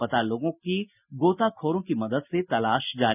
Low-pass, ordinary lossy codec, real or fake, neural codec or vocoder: 3.6 kHz; none; real; none